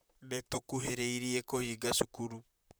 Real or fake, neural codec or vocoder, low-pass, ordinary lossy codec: fake; vocoder, 44.1 kHz, 128 mel bands, Pupu-Vocoder; none; none